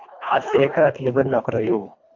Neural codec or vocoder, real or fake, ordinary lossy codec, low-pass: codec, 24 kHz, 1.5 kbps, HILCodec; fake; MP3, 64 kbps; 7.2 kHz